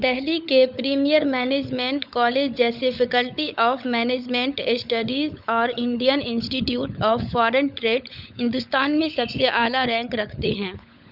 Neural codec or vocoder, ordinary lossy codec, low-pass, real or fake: codec, 16 kHz, 16 kbps, FunCodec, trained on LibriTTS, 50 frames a second; none; 5.4 kHz; fake